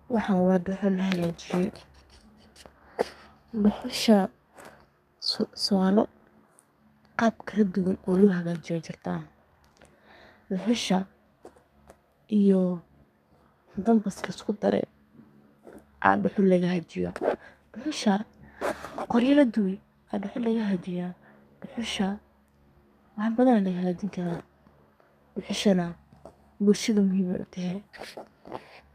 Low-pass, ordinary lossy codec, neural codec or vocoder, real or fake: 14.4 kHz; none; codec, 32 kHz, 1.9 kbps, SNAC; fake